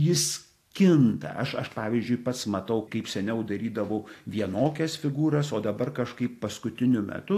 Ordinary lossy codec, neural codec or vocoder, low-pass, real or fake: AAC, 64 kbps; none; 14.4 kHz; real